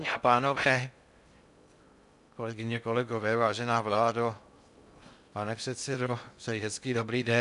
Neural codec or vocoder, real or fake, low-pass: codec, 16 kHz in and 24 kHz out, 0.6 kbps, FocalCodec, streaming, 2048 codes; fake; 10.8 kHz